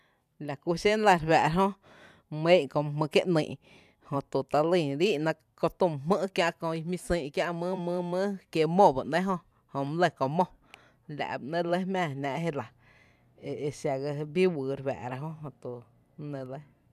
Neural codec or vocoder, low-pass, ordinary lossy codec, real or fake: vocoder, 44.1 kHz, 128 mel bands every 512 samples, BigVGAN v2; 14.4 kHz; none; fake